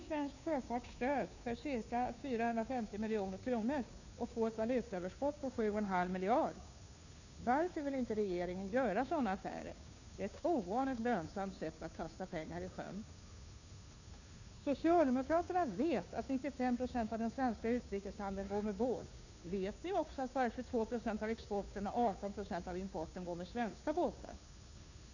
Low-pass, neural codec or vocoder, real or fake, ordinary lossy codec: 7.2 kHz; codec, 16 kHz, 2 kbps, FunCodec, trained on Chinese and English, 25 frames a second; fake; none